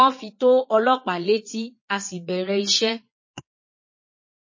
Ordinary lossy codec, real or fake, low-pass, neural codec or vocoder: MP3, 32 kbps; fake; 7.2 kHz; vocoder, 22.05 kHz, 80 mel bands, Vocos